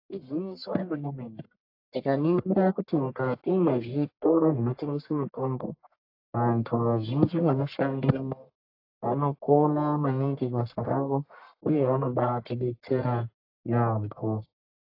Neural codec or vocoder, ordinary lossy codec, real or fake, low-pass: codec, 44.1 kHz, 1.7 kbps, Pupu-Codec; AAC, 32 kbps; fake; 5.4 kHz